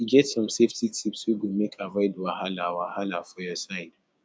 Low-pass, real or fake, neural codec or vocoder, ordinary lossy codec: none; fake; codec, 16 kHz, 6 kbps, DAC; none